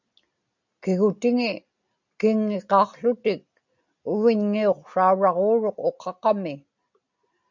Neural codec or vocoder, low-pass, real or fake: none; 7.2 kHz; real